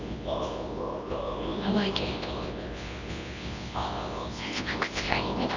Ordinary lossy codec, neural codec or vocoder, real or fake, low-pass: AAC, 48 kbps; codec, 24 kHz, 0.9 kbps, WavTokenizer, large speech release; fake; 7.2 kHz